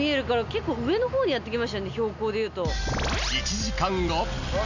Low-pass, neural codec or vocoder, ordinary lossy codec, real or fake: 7.2 kHz; none; none; real